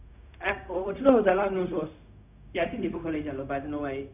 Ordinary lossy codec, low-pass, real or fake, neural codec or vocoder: none; 3.6 kHz; fake; codec, 16 kHz, 0.4 kbps, LongCat-Audio-Codec